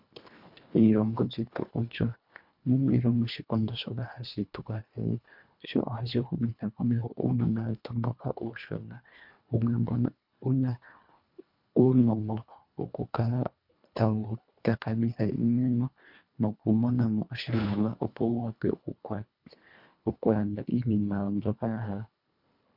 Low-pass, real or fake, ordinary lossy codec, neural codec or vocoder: 5.4 kHz; fake; MP3, 48 kbps; codec, 24 kHz, 1.5 kbps, HILCodec